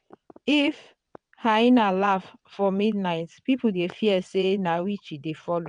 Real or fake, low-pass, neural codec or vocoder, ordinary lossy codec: fake; 9.9 kHz; vocoder, 22.05 kHz, 80 mel bands, WaveNeXt; none